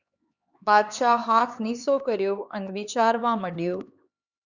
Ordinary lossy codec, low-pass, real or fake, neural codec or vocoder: Opus, 64 kbps; 7.2 kHz; fake; codec, 16 kHz, 4 kbps, X-Codec, HuBERT features, trained on LibriSpeech